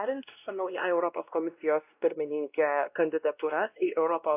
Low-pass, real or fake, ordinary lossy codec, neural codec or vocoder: 3.6 kHz; fake; AAC, 32 kbps; codec, 16 kHz, 1 kbps, X-Codec, WavLM features, trained on Multilingual LibriSpeech